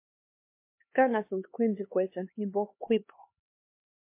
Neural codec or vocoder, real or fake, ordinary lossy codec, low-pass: codec, 16 kHz, 2 kbps, X-Codec, HuBERT features, trained on LibriSpeech; fake; MP3, 24 kbps; 3.6 kHz